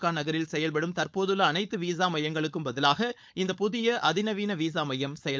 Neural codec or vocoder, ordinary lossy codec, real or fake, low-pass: codec, 16 kHz, 4.8 kbps, FACodec; none; fake; none